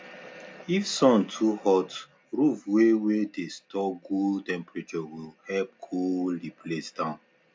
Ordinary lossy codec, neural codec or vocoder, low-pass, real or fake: none; none; none; real